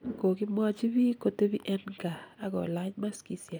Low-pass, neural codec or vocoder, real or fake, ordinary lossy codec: none; none; real; none